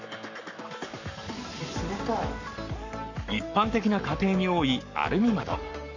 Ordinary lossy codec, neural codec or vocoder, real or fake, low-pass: none; codec, 44.1 kHz, 7.8 kbps, Pupu-Codec; fake; 7.2 kHz